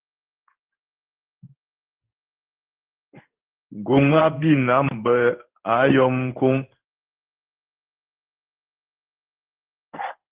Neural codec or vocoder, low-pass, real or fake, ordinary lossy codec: codec, 16 kHz in and 24 kHz out, 1 kbps, XY-Tokenizer; 3.6 kHz; fake; Opus, 16 kbps